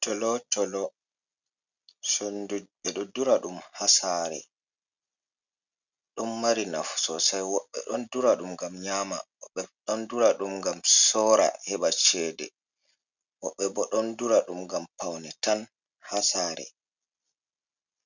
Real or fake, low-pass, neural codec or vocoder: real; 7.2 kHz; none